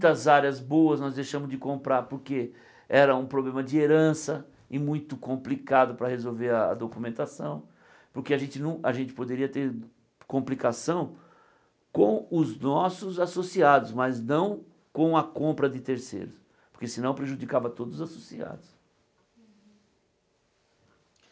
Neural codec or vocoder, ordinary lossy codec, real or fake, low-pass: none; none; real; none